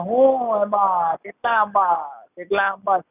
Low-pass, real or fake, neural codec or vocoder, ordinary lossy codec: 3.6 kHz; real; none; none